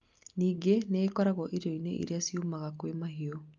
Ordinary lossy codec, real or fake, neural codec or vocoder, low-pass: Opus, 24 kbps; real; none; 7.2 kHz